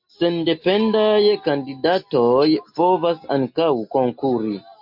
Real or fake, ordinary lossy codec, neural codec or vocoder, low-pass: real; MP3, 48 kbps; none; 5.4 kHz